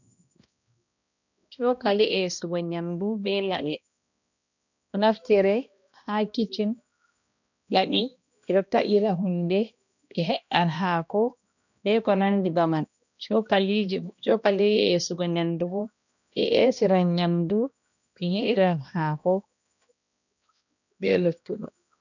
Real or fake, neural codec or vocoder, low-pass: fake; codec, 16 kHz, 1 kbps, X-Codec, HuBERT features, trained on balanced general audio; 7.2 kHz